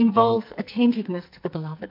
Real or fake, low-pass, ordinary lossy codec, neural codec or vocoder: fake; 5.4 kHz; MP3, 48 kbps; codec, 44.1 kHz, 2.6 kbps, SNAC